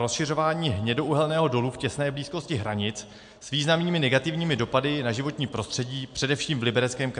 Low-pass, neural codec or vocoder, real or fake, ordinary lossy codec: 9.9 kHz; none; real; MP3, 64 kbps